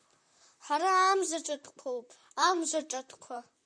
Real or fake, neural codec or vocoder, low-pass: fake; vocoder, 44.1 kHz, 128 mel bands, Pupu-Vocoder; 9.9 kHz